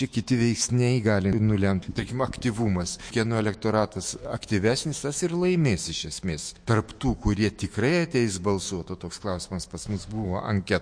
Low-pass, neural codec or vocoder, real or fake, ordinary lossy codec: 9.9 kHz; autoencoder, 48 kHz, 128 numbers a frame, DAC-VAE, trained on Japanese speech; fake; MP3, 48 kbps